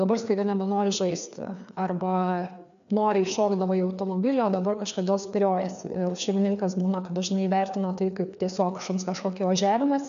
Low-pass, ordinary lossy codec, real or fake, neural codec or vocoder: 7.2 kHz; AAC, 96 kbps; fake; codec, 16 kHz, 2 kbps, FreqCodec, larger model